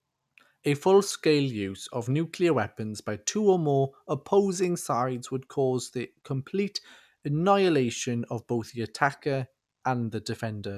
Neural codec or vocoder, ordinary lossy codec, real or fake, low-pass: none; none; real; 14.4 kHz